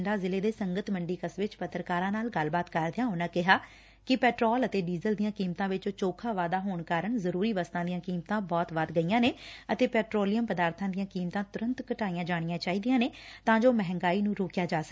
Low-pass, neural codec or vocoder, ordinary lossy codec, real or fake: none; none; none; real